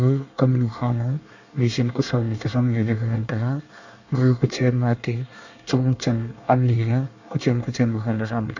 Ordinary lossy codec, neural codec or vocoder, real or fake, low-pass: none; codec, 24 kHz, 1 kbps, SNAC; fake; 7.2 kHz